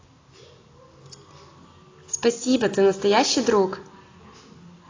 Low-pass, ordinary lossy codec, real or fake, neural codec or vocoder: 7.2 kHz; AAC, 32 kbps; real; none